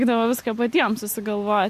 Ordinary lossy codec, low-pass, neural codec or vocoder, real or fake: MP3, 64 kbps; 14.4 kHz; none; real